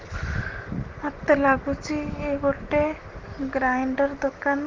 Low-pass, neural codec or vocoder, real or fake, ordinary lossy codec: 7.2 kHz; none; real; Opus, 16 kbps